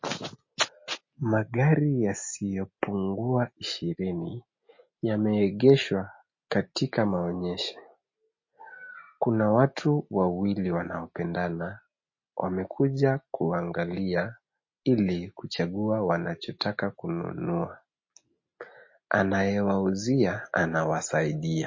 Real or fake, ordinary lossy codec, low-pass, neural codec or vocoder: real; MP3, 32 kbps; 7.2 kHz; none